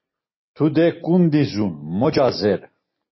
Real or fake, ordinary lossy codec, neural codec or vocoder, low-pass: real; MP3, 24 kbps; none; 7.2 kHz